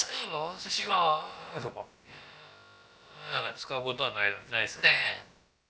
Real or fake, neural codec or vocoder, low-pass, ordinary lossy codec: fake; codec, 16 kHz, about 1 kbps, DyCAST, with the encoder's durations; none; none